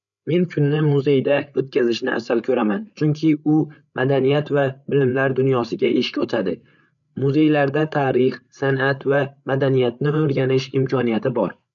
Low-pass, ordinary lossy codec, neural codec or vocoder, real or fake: 7.2 kHz; none; codec, 16 kHz, 8 kbps, FreqCodec, larger model; fake